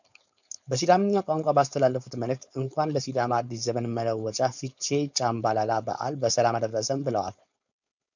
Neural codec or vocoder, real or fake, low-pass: codec, 16 kHz, 4.8 kbps, FACodec; fake; 7.2 kHz